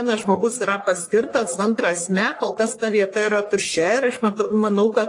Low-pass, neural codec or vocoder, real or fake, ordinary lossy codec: 10.8 kHz; codec, 44.1 kHz, 1.7 kbps, Pupu-Codec; fake; AAC, 48 kbps